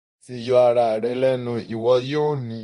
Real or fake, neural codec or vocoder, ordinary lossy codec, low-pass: fake; codec, 24 kHz, 0.9 kbps, DualCodec; MP3, 48 kbps; 10.8 kHz